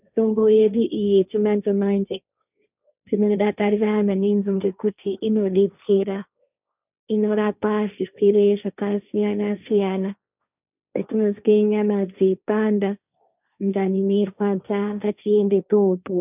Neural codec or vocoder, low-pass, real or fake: codec, 16 kHz, 1.1 kbps, Voila-Tokenizer; 3.6 kHz; fake